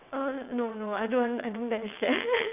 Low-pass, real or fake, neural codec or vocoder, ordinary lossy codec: 3.6 kHz; fake; vocoder, 22.05 kHz, 80 mel bands, WaveNeXt; none